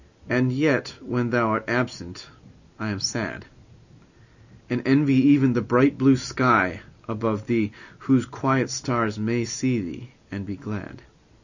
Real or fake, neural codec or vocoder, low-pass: real; none; 7.2 kHz